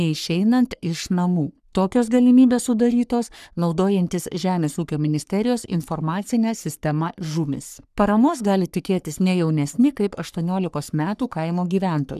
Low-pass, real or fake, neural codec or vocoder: 14.4 kHz; fake; codec, 44.1 kHz, 3.4 kbps, Pupu-Codec